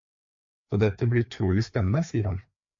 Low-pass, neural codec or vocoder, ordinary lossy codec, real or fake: 7.2 kHz; codec, 16 kHz, 2 kbps, FreqCodec, larger model; MP3, 48 kbps; fake